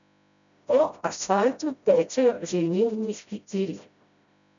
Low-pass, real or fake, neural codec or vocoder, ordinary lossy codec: 7.2 kHz; fake; codec, 16 kHz, 0.5 kbps, FreqCodec, smaller model; none